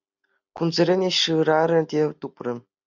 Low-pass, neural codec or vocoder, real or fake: 7.2 kHz; none; real